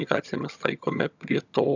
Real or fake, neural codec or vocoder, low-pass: fake; vocoder, 22.05 kHz, 80 mel bands, HiFi-GAN; 7.2 kHz